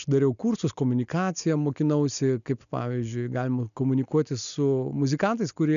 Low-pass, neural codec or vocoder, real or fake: 7.2 kHz; none; real